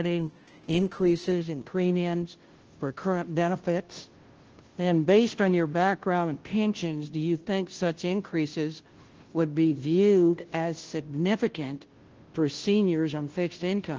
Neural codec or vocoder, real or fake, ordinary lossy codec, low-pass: codec, 16 kHz, 0.5 kbps, FunCodec, trained on Chinese and English, 25 frames a second; fake; Opus, 16 kbps; 7.2 kHz